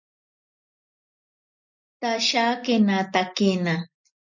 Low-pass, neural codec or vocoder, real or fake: 7.2 kHz; none; real